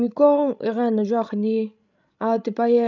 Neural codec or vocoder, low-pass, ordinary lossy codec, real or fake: codec, 16 kHz, 16 kbps, FreqCodec, larger model; 7.2 kHz; none; fake